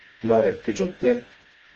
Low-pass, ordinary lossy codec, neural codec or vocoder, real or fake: 7.2 kHz; Opus, 24 kbps; codec, 16 kHz, 1 kbps, FreqCodec, smaller model; fake